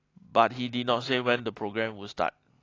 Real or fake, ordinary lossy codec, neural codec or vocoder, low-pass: real; AAC, 32 kbps; none; 7.2 kHz